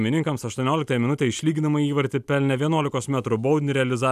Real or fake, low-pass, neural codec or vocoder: real; 14.4 kHz; none